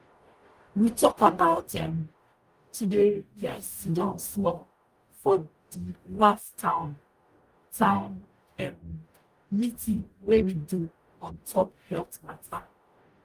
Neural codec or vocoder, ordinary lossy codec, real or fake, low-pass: codec, 44.1 kHz, 0.9 kbps, DAC; Opus, 32 kbps; fake; 14.4 kHz